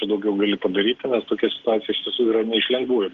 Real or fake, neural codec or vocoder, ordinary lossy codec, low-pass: real; none; Opus, 16 kbps; 7.2 kHz